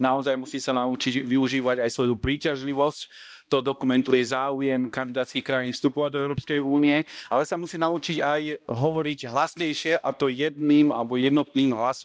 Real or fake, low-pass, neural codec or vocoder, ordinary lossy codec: fake; none; codec, 16 kHz, 1 kbps, X-Codec, HuBERT features, trained on balanced general audio; none